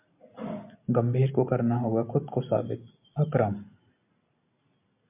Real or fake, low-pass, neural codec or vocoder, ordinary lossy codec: real; 3.6 kHz; none; MP3, 32 kbps